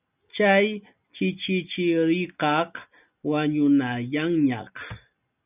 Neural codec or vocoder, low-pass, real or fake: none; 3.6 kHz; real